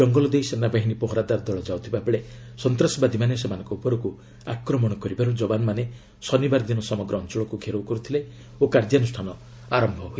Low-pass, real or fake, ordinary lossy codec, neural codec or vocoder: none; real; none; none